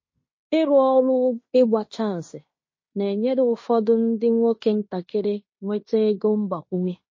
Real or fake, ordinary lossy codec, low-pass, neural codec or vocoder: fake; MP3, 32 kbps; 7.2 kHz; codec, 16 kHz in and 24 kHz out, 0.9 kbps, LongCat-Audio-Codec, fine tuned four codebook decoder